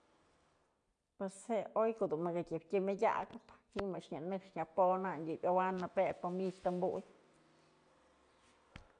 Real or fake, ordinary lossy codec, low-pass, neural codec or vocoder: real; none; 9.9 kHz; none